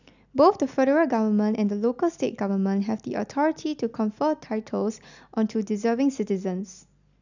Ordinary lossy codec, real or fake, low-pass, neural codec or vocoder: none; real; 7.2 kHz; none